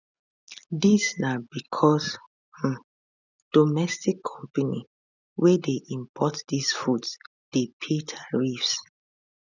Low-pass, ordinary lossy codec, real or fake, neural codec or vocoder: 7.2 kHz; none; real; none